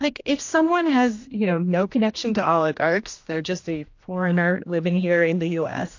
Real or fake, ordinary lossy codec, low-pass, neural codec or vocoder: fake; AAC, 48 kbps; 7.2 kHz; codec, 16 kHz, 1 kbps, X-Codec, HuBERT features, trained on general audio